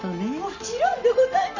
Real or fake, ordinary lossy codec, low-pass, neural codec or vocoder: real; none; 7.2 kHz; none